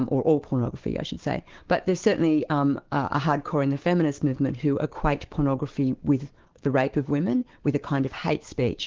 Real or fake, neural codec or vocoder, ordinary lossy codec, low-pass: fake; codec, 16 kHz, 6 kbps, DAC; Opus, 16 kbps; 7.2 kHz